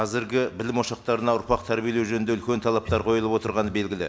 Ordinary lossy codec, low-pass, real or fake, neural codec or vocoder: none; none; real; none